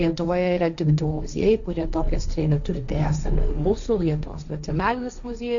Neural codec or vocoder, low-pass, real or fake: codec, 16 kHz, 1.1 kbps, Voila-Tokenizer; 7.2 kHz; fake